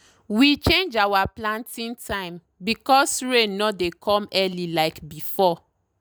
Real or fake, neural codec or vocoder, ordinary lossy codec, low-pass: real; none; none; none